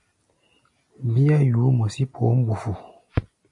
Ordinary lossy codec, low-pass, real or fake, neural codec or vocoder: AAC, 64 kbps; 10.8 kHz; real; none